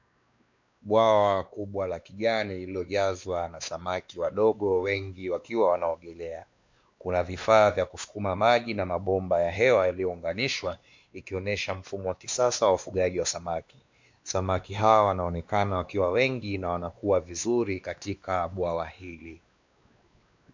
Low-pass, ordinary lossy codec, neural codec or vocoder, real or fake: 7.2 kHz; MP3, 64 kbps; codec, 16 kHz, 2 kbps, X-Codec, WavLM features, trained on Multilingual LibriSpeech; fake